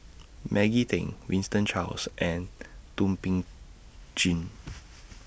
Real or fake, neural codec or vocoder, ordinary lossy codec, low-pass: real; none; none; none